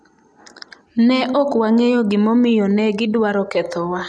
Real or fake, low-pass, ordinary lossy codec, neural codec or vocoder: real; none; none; none